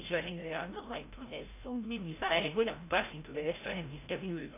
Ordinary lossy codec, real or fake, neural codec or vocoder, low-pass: AAC, 24 kbps; fake; codec, 16 kHz, 0.5 kbps, FreqCodec, larger model; 3.6 kHz